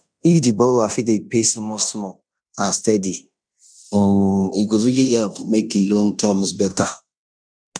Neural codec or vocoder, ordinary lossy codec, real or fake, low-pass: codec, 16 kHz in and 24 kHz out, 0.9 kbps, LongCat-Audio-Codec, fine tuned four codebook decoder; none; fake; 9.9 kHz